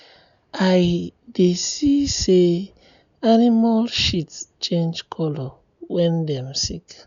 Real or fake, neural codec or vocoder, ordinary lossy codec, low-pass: real; none; none; 7.2 kHz